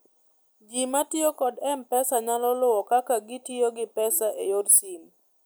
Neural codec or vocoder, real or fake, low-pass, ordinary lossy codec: none; real; none; none